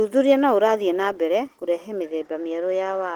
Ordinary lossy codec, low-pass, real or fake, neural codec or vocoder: Opus, 16 kbps; 19.8 kHz; real; none